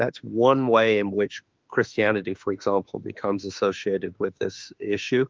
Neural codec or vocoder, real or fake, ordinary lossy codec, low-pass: autoencoder, 48 kHz, 32 numbers a frame, DAC-VAE, trained on Japanese speech; fake; Opus, 32 kbps; 7.2 kHz